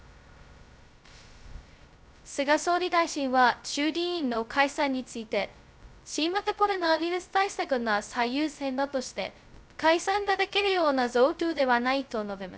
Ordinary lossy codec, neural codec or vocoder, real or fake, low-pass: none; codec, 16 kHz, 0.2 kbps, FocalCodec; fake; none